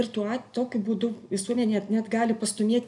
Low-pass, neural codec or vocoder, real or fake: 10.8 kHz; none; real